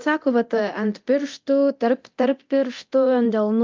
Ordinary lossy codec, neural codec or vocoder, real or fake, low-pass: Opus, 24 kbps; codec, 24 kHz, 0.9 kbps, DualCodec; fake; 7.2 kHz